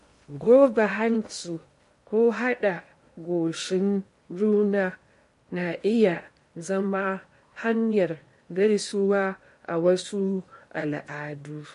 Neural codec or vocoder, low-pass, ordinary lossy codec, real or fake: codec, 16 kHz in and 24 kHz out, 0.8 kbps, FocalCodec, streaming, 65536 codes; 10.8 kHz; MP3, 48 kbps; fake